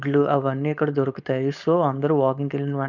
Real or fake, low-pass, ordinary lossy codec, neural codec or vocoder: fake; 7.2 kHz; none; codec, 16 kHz, 4.8 kbps, FACodec